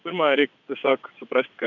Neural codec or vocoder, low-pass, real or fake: vocoder, 22.05 kHz, 80 mel bands, WaveNeXt; 7.2 kHz; fake